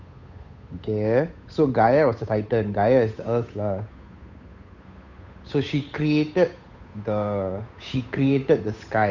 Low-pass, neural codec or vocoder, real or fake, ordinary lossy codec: 7.2 kHz; codec, 16 kHz, 8 kbps, FunCodec, trained on Chinese and English, 25 frames a second; fake; none